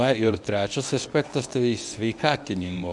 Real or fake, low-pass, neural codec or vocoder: fake; 10.8 kHz; codec, 24 kHz, 0.9 kbps, WavTokenizer, medium speech release version 1